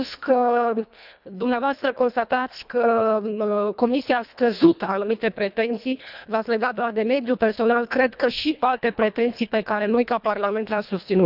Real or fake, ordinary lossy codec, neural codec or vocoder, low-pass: fake; none; codec, 24 kHz, 1.5 kbps, HILCodec; 5.4 kHz